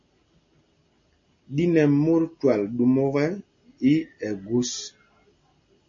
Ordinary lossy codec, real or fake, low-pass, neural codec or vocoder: MP3, 32 kbps; real; 7.2 kHz; none